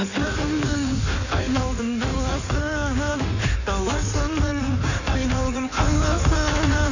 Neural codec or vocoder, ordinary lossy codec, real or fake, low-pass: codec, 16 kHz in and 24 kHz out, 1.1 kbps, FireRedTTS-2 codec; none; fake; 7.2 kHz